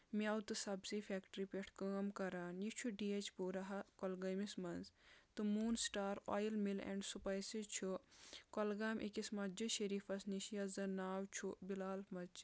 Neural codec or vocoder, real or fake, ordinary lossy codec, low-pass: none; real; none; none